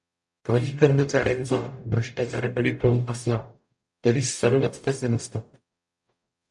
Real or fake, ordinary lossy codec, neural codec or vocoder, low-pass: fake; MP3, 64 kbps; codec, 44.1 kHz, 0.9 kbps, DAC; 10.8 kHz